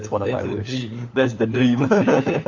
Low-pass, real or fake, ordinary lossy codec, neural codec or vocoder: 7.2 kHz; fake; AAC, 48 kbps; codec, 16 kHz, 4 kbps, FunCodec, trained on LibriTTS, 50 frames a second